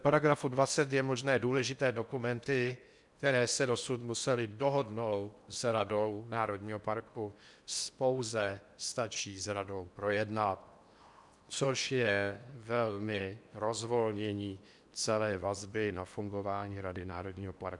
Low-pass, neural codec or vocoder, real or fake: 10.8 kHz; codec, 16 kHz in and 24 kHz out, 0.8 kbps, FocalCodec, streaming, 65536 codes; fake